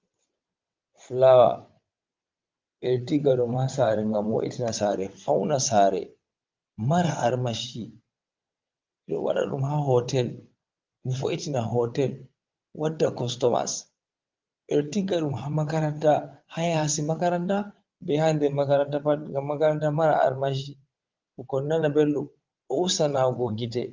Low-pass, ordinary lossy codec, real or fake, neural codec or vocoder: 7.2 kHz; Opus, 32 kbps; fake; vocoder, 22.05 kHz, 80 mel bands, WaveNeXt